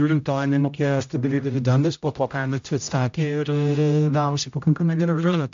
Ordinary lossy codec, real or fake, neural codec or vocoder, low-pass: AAC, 64 kbps; fake; codec, 16 kHz, 0.5 kbps, X-Codec, HuBERT features, trained on general audio; 7.2 kHz